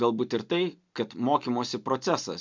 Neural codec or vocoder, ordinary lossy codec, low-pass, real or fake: none; MP3, 64 kbps; 7.2 kHz; real